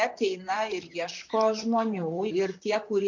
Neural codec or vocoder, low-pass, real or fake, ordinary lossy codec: vocoder, 44.1 kHz, 128 mel bands, Pupu-Vocoder; 7.2 kHz; fake; MP3, 48 kbps